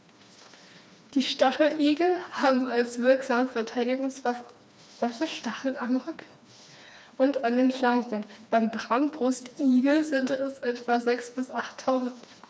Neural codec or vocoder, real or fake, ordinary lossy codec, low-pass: codec, 16 kHz, 2 kbps, FreqCodec, smaller model; fake; none; none